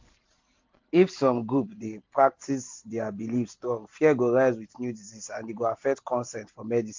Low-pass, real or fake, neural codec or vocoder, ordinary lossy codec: 7.2 kHz; real; none; MP3, 48 kbps